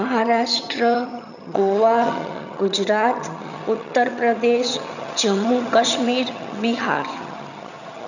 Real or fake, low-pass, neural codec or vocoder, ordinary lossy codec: fake; 7.2 kHz; vocoder, 22.05 kHz, 80 mel bands, HiFi-GAN; none